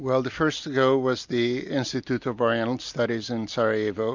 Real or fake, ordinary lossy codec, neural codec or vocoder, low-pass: real; MP3, 64 kbps; none; 7.2 kHz